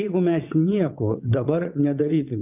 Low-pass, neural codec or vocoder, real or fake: 3.6 kHz; vocoder, 22.05 kHz, 80 mel bands, WaveNeXt; fake